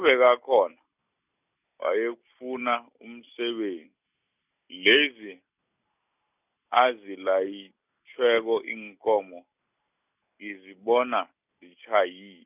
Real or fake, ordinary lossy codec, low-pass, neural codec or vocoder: real; none; 3.6 kHz; none